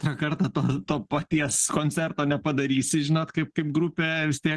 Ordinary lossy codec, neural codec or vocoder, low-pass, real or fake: Opus, 16 kbps; none; 10.8 kHz; real